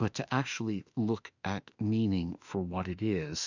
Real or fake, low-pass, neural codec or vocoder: fake; 7.2 kHz; autoencoder, 48 kHz, 32 numbers a frame, DAC-VAE, trained on Japanese speech